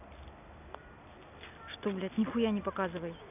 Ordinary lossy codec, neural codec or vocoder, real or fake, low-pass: none; none; real; 3.6 kHz